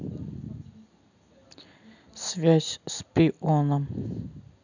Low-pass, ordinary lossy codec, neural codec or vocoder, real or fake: 7.2 kHz; none; none; real